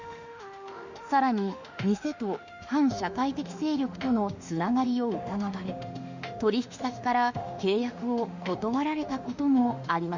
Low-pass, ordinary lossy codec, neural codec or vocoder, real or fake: 7.2 kHz; Opus, 64 kbps; autoencoder, 48 kHz, 32 numbers a frame, DAC-VAE, trained on Japanese speech; fake